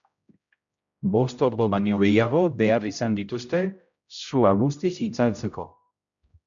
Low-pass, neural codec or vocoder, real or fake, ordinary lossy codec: 7.2 kHz; codec, 16 kHz, 0.5 kbps, X-Codec, HuBERT features, trained on general audio; fake; MP3, 64 kbps